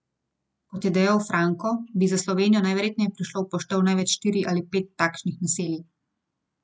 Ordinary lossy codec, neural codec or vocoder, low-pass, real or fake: none; none; none; real